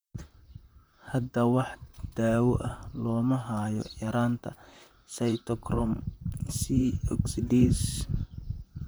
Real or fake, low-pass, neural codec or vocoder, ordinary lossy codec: fake; none; vocoder, 44.1 kHz, 128 mel bands, Pupu-Vocoder; none